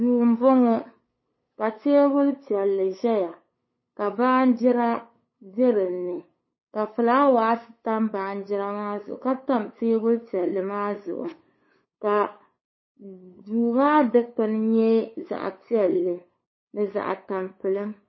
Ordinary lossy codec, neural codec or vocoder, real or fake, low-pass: MP3, 24 kbps; codec, 16 kHz, 8 kbps, FunCodec, trained on LibriTTS, 25 frames a second; fake; 7.2 kHz